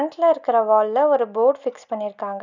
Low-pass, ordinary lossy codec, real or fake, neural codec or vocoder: 7.2 kHz; none; real; none